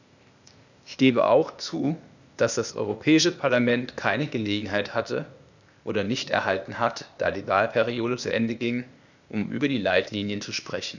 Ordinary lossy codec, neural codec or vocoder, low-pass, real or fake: none; codec, 16 kHz, 0.8 kbps, ZipCodec; 7.2 kHz; fake